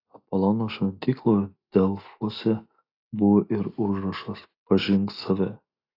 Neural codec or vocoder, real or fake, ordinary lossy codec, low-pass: none; real; AAC, 32 kbps; 5.4 kHz